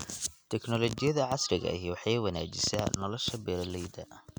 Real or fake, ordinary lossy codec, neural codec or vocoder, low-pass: real; none; none; none